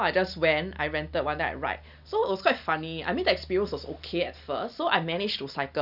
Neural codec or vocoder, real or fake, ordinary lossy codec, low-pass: none; real; none; 5.4 kHz